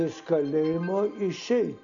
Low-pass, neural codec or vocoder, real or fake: 7.2 kHz; none; real